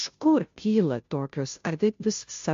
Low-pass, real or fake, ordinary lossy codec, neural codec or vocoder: 7.2 kHz; fake; MP3, 48 kbps; codec, 16 kHz, 0.5 kbps, FunCodec, trained on Chinese and English, 25 frames a second